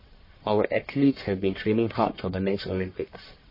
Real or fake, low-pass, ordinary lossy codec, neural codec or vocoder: fake; 5.4 kHz; MP3, 24 kbps; codec, 44.1 kHz, 1.7 kbps, Pupu-Codec